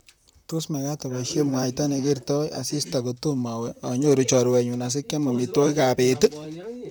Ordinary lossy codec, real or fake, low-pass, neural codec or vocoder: none; fake; none; vocoder, 44.1 kHz, 128 mel bands, Pupu-Vocoder